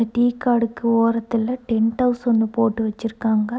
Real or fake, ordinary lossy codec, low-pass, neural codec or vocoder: real; none; none; none